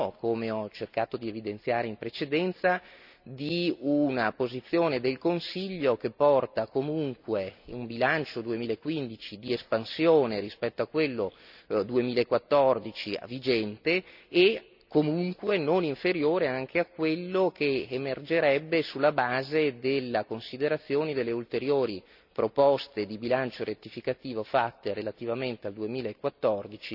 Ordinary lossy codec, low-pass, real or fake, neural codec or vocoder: none; 5.4 kHz; real; none